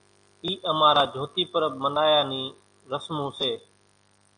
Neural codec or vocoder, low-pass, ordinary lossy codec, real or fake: none; 9.9 kHz; AAC, 64 kbps; real